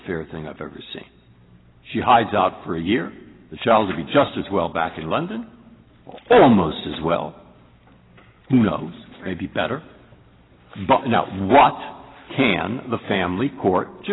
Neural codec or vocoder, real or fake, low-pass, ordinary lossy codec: none; real; 7.2 kHz; AAC, 16 kbps